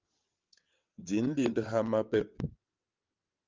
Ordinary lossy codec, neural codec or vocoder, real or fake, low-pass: Opus, 24 kbps; vocoder, 22.05 kHz, 80 mel bands, WaveNeXt; fake; 7.2 kHz